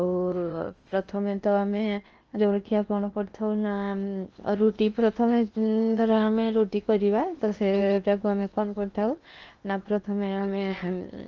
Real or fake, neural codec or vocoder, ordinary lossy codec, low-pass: fake; codec, 16 kHz in and 24 kHz out, 0.8 kbps, FocalCodec, streaming, 65536 codes; Opus, 24 kbps; 7.2 kHz